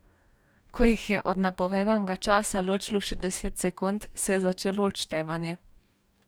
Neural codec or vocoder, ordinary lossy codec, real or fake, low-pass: codec, 44.1 kHz, 2.6 kbps, DAC; none; fake; none